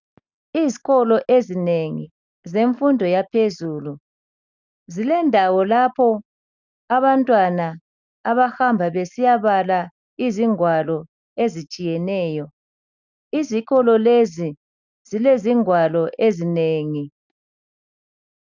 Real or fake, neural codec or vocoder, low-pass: real; none; 7.2 kHz